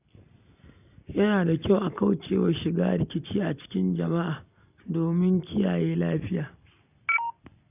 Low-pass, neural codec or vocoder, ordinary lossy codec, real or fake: 3.6 kHz; none; none; real